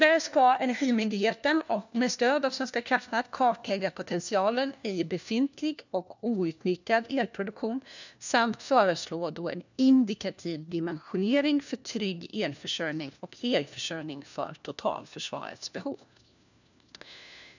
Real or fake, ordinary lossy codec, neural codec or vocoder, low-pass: fake; none; codec, 16 kHz, 1 kbps, FunCodec, trained on LibriTTS, 50 frames a second; 7.2 kHz